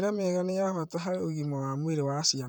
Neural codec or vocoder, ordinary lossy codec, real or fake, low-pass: vocoder, 44.1 kHz, 128 mel bands, Pupu-Vocoder; none; fake; none